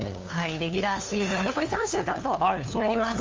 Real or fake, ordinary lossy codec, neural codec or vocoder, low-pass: fake; Opus, 32 kbps; codec, 16 kHz, 2 kbps, FunCodec, trained on LibriTTS, 25 frames a second; 7.2 kHz